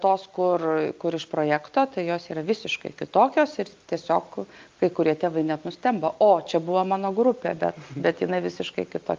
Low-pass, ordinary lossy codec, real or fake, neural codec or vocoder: 7.2 kHz; Opus, 24 kbps; real; none